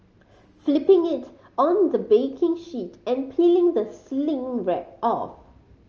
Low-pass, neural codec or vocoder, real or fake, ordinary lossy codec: 7.2 kHz; none; real; Opus, 24 kbps